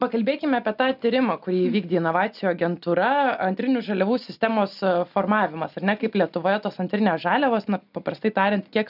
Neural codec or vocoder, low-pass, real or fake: none; 5.4 kHz; real